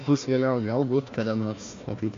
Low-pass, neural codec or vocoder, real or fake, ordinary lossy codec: 7.2 kHz; codec, 16 kHz, 1 kbps, FunCodec, trained on Chinese and English, 50 frames a second; fake; AAC, 48 kbps